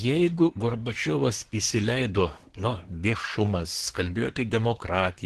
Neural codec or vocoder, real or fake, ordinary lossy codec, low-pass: codec, 24 kHz, 1 kbps, SNAC; fake; Opus, 16 kbps; 10.8 kHz